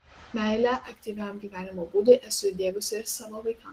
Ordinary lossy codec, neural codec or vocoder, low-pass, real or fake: Opus, 16 kbps; none; 14.4 kHz; real